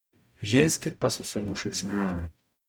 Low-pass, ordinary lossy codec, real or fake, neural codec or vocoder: none; none; fake; codec, 44.1 kHz, 0.9 kbps, DAC